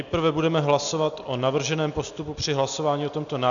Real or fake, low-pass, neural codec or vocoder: real; 7.2 kHz; none